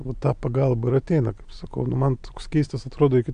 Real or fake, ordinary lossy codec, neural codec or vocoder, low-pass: real; AAC, 64 kbps; none; 9.9 kHz